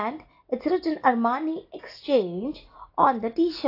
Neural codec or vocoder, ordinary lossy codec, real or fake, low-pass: none; AAC, 32 kbps; real; 5.4 kHz